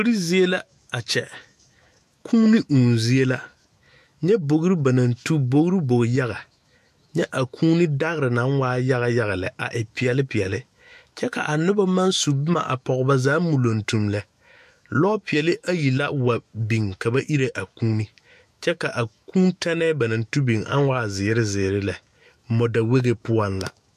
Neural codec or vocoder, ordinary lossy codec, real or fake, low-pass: autoencoder, 48 kHz, 128 numbers a frame, DAC-VAE, trained on Japanese speech; MP3, 96 kbps; fake; 14.4 kHz